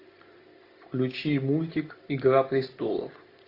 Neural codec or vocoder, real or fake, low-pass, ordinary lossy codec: none; real; 5.4 kHz; AAC, 32 kbps